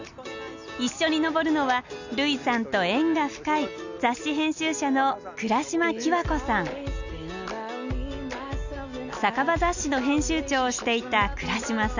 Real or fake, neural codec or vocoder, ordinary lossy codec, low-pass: real; none; none; 7.2 kHz